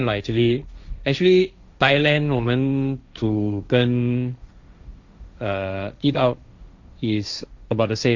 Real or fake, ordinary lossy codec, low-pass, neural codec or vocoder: fake; Opus, 64 kbps; 7.2 kHz; codec, 16 kHz, 1.1 kbps, Voila-Tokenizer